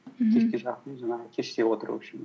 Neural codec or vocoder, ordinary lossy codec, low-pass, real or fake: none; none; none; real